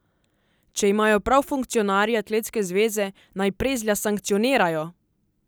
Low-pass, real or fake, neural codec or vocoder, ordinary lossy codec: none; real; none; none